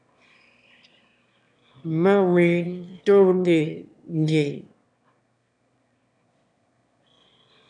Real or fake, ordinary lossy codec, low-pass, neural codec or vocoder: fake; MP3, 96 kbps; 9.9 kHz; autoencoder, 22.05 kHz, a latent of 192 numbers a frame, VITS, trained on one speaker